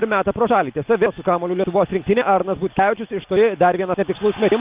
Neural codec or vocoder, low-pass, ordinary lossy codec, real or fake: none; 3.6 kHz; Opus, 32 kbps; real